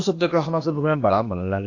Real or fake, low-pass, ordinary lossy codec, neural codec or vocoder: fake; 7.2 kHz; AAC, 48 kbps; codec, 16 kHz, 0.8 kbps, ZipCodec